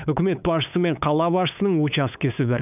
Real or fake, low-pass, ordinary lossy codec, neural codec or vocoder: fake; 3.6 kHz; none; codec, 16 kHz, 8 kbps, FunCodec, trained on LibriTTS, 25 frames a second